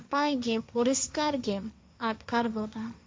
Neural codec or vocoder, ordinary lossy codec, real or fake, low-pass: codec, 16 kHz, 1.1 kbps, Voila-Tokenizer; none; fake; none